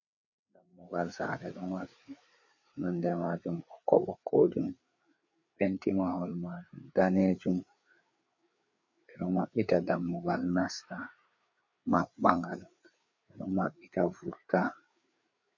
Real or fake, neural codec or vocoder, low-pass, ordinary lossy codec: fake; codec, 16 kHz, 4 kbps, FreqCodec, larger model; 7.2 kHz; MP3, 48 kbps